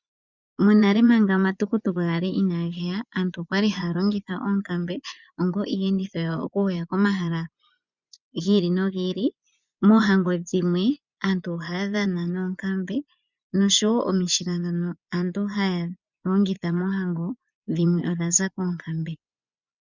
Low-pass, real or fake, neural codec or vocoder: 7.2 kHz; fake; vocoder, 24 kHz, 100 mel bands, Vocos